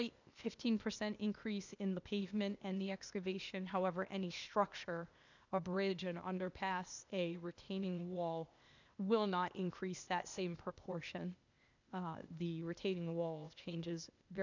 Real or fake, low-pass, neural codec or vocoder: fake; 7.2 kHz; codec, 16 kHz, 0.8 kbps, ZipCodec